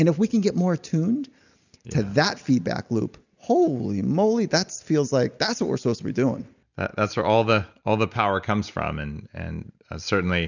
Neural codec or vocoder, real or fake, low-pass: none; real; 7.2 kHz